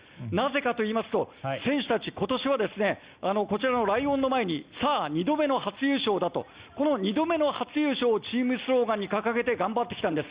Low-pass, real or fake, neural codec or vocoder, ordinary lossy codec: 3.6 kHz; real; none; Opus, 64 kbps